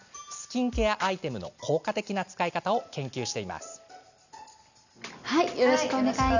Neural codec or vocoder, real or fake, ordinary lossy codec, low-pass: none; real; none; 7.2 kHz